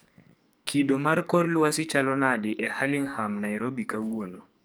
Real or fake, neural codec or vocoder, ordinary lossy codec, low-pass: fake; codec, 44.1 kHz, 2.6 kbps, SNAC; none; none